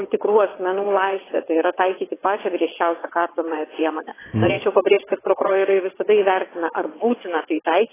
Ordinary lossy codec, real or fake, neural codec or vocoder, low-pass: AAC, 16 kbps; fake; vocoder, 22.05 kHz, 80 mel bands, WaveNeXt; 3.6 kHz